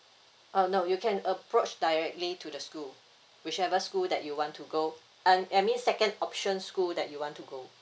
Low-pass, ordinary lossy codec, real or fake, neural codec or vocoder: none; none; real; none